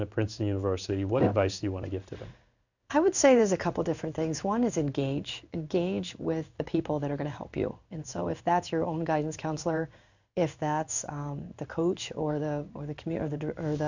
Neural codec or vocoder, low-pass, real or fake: codec, 16 kHz in and 24 kHz out, 1 kbps, XY-Tokenizer; 7.2 kHz; fake